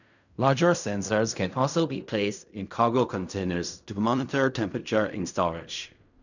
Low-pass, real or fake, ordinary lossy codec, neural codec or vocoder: 7.2 kHz; fake; none; codec, 16 kHz in and 24 kHz out, 0.4 kbps, LongCat-Audio-Codec, fine tuned four codebook decoder